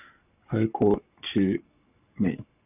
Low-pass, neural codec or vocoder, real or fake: 3.6 kHz; codec, 44.1 kHz, 7.8 kbps, Pupu-Codec; fake